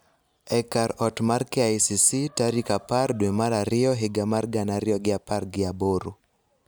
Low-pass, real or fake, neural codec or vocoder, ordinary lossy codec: none; real; none; none